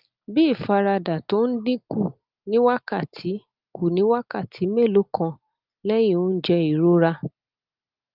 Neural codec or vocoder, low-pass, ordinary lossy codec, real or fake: none; 5.4 kHz; Opus, 24 kbps; real